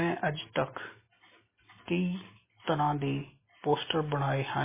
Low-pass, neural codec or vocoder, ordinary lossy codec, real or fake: 3.6 kHz; none; MP3, 16 kbps; real